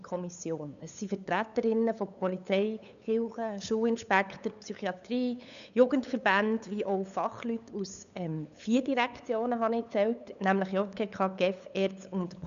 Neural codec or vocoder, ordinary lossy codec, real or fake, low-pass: codec, 16 kHz, 8 kbps, FunCodec, trained on LibriTTS, 25 frames a second; none; fake; 7.2 kHz